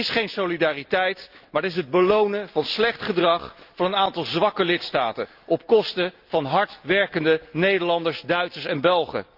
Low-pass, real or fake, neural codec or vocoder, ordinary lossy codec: 5.4 kHz; real; none; Opus, 24 kbps